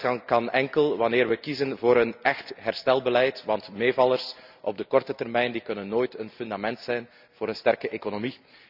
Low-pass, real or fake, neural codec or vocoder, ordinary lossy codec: 5.4 kHz; real; none; none